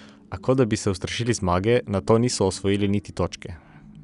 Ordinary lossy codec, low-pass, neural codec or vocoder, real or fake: none; 10.8 kHz; none; real